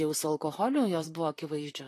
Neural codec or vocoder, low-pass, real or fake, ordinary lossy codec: vocoder, 44.1 kHz, 128 mel bands, Pupu-Vocoder; 14.4 kHz; fake; AAC, 48 kbps